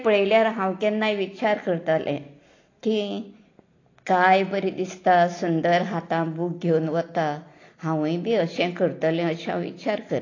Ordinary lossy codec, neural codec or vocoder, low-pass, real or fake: AAC, 32 kbps; none; 7.2 kHz; real